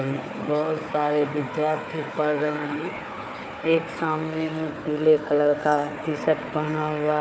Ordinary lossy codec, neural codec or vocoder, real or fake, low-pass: none; codec, 16 kHz, 4 kbps, FunCodec, trained on Chinese and English, 50 frames a second; fake; none